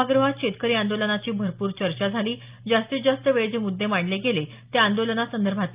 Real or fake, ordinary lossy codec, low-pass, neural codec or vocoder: real; Opus, 24 kbps; 3.6 kHz; none